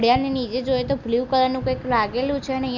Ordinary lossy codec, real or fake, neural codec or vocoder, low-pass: none; real; none; 7.2 kHz